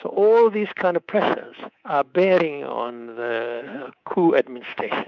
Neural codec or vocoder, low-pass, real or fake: codec, 24 kHz, 3.1 kbps, DualCodec; 7.2 kHz; fake